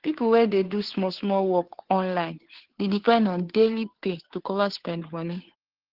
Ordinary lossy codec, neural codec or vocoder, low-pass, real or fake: Opus, 16 kbps; codec, 16 kHz, 2 kbps, FunCodec, trained on Chinese and English, 25 frames a second; 5.4 kHz; fake